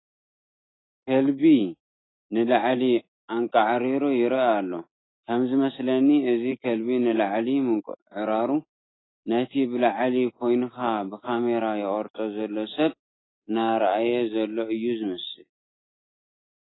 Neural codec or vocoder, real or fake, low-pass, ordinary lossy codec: none; real; 7.2 kHz; AAC, 16 kbps